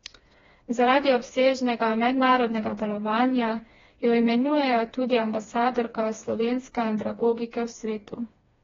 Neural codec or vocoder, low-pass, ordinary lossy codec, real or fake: codec, 16 kHz, 2 kbps, FreqCodec, smaller model; 7.2 kHz; AAC, 24 kbps; fake